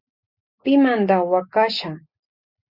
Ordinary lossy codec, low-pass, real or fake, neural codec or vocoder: Opus, 64 kbps; 5.4 kHz; real; none